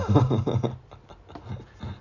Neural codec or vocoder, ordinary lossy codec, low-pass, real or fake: none; none; 7.2 kHz; real